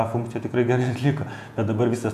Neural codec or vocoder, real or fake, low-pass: vocoder, 48 kHz, 128 mel bands, Vocos; fake; 14.4 kHz